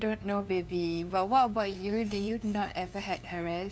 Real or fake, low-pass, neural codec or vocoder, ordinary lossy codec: fake; none; codec, 16 kHz, 2 kbps, FunCodec, trained on LibriTTS, 25 frames a second; none